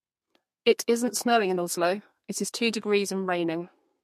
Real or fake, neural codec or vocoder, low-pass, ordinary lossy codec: fake; codec, 32 kHz, 1.9 kbps, SNAC; 14.4 kHz; MP3, 64 kbps